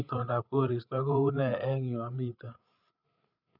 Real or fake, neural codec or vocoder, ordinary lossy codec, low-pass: fake; codec, 16 kHz, 8 kbps, FreqCodec, larger model; none; 5.4 kHz